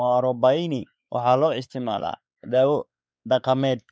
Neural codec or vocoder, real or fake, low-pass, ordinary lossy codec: codec, 16 kHz, 4 kbps, X-Codec, WavLM features, trained on Multilingual LibriSpeech; fake; none; none